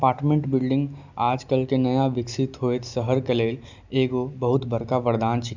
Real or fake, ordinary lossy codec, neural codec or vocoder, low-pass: real; none; none; 7.2 kHz